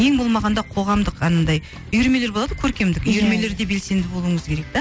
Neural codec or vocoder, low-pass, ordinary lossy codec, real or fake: none; none; none; real